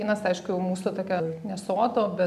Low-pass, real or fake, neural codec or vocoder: 14.4 kHz; real; none